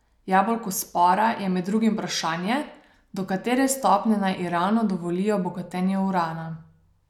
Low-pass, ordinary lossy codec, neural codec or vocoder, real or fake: 19.8 kHz; none; none; real